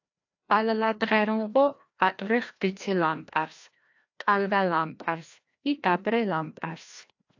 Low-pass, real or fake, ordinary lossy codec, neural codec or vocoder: 7.2 kHz; fake; AAC, 48 kbps; codec, 16 kHz, 1 kbps, FreqCodec, larger model